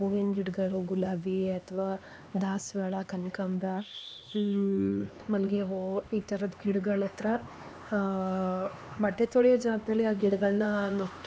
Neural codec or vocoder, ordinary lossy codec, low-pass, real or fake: codec, 16 kHz, 2 kbps, X-Codec, HuBERT features, trained on LibriSpeech; none; none; fake